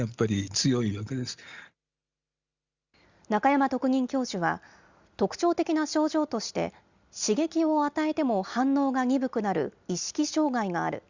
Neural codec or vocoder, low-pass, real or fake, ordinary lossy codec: none; 7.2 kHz; real; Opus, 64 kbps